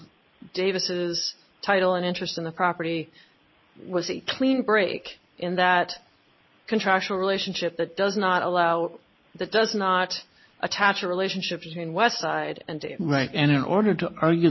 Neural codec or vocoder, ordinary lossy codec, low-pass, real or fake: none; MP3, 24 kbps; 7.2 kHz; real